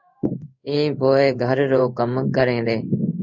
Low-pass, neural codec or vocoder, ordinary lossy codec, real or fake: 7.2 kHz; codec, 16 kHz in and 24 kHz out, 1 kbps, XY-Tokenizer; MP3, 64 kbps; fake